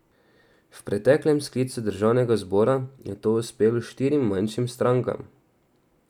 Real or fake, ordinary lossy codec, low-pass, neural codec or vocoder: real; none; 19.8 kHz; none